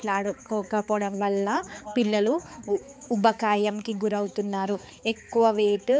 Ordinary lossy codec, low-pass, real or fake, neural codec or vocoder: none; none; fake; codec, 16 kHz, 4 kbps, X-Codec, HuBERT features, trained on balanced general audio